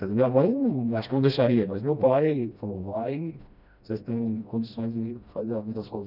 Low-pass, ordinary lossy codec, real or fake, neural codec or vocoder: 5.4 kHz; none; fake; codec, 16 kHz, 1 kbps, FreqCodec, smaller model